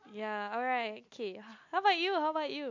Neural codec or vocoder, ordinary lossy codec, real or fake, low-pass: none; MP3, 48 kbps; real; 7.2 kHz